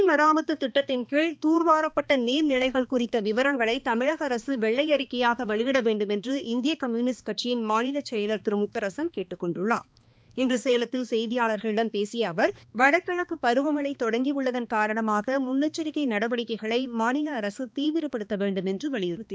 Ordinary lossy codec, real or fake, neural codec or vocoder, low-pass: none; fake; codec, 16 kHz, 2 kbps, X-Codec, HuBERT features, trained on balanced general audio; none